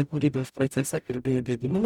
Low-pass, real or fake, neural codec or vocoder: 19.8 kHz; fake; codec, 44.1 kHz, 0.9 kbps, DAC